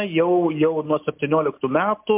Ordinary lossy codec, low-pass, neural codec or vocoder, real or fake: MP3, 32 kbps; 3.6 kHz; none; real